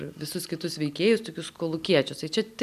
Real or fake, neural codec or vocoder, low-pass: real; none; 14.4 kHz